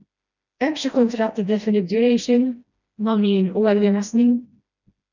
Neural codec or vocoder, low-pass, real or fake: codec, 16 kHz, 1 kbps, FreqCodec, smaller model; 7.2 kHz; fake